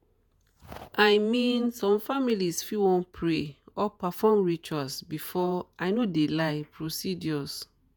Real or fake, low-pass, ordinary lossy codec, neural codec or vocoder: fake; none; none; vocoder, 48 kHz, 128 mel bands, Vocos